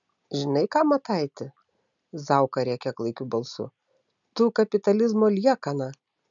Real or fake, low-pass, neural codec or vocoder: real; 7.2 kHz; none